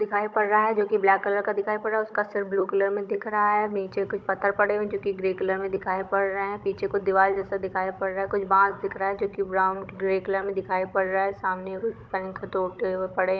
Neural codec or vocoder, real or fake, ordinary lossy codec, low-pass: codec, 16 kHz, 16 kbps, FunCodec, trained on Chinese and English, 50 frames a second; fake; none; none